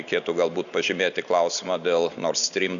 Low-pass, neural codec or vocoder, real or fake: 7.2 kHz; none; real